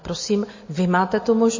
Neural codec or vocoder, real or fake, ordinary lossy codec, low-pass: none; real; MP3, 32 kbps; 7.2 kHz